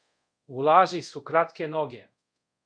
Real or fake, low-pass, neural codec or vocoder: fake; 9.9 kHz; codec, 24 kHz, 0.5 kbps, DualCodec